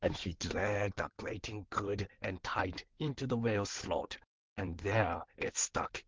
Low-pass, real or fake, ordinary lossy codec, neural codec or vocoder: 7.2 kHz; fake; Opus, 16 kbps; codec, 16 kHz in and 24 kHz out, 2.2 kbps, FireRedTTS-2 codec